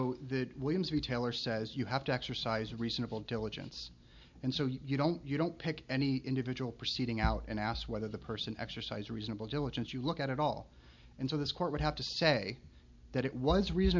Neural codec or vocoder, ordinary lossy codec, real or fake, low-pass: none; MP3, 64 kbps; real; 7.2 kHz